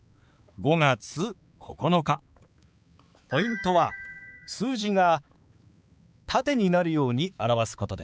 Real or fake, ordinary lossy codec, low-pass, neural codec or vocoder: fake; none; none; codec, 16 kHz, 4 kbps, X-Codec, HuBERT features, trained on balanced general audio